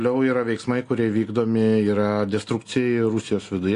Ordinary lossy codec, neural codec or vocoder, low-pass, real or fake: AAC, 48 kbps; none; 10.8 kHz; real